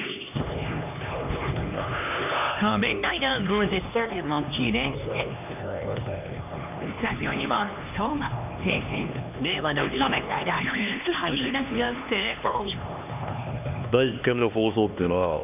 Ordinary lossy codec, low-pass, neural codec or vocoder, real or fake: none; 3.6 kHz; codec, 16 kHz, 2 kbps, X-Codec, HuBERT features, trained on LibriSpeech; fake